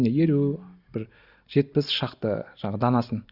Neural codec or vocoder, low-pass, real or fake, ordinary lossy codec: none; 5.4 kHz; real; Opus, 64 kbps